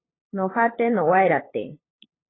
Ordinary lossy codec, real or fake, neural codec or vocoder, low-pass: AAC, 16 kbps; fake; codec, 16 kHz, 8 kbps, FunCodec, trained on LibriTTS, 25 frames a second; 7.2 kHz